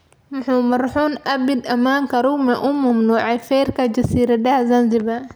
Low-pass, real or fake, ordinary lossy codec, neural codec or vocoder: none; real; none; none